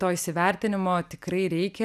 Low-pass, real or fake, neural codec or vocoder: 14.4 kHz; real; none